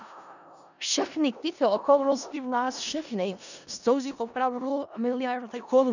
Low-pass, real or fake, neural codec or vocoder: 7.2 kHz; fake; codec, 16 kHz in and 24 kHz out, 0.4 kbps, LongCat-Audio-Codec, four codebook decoder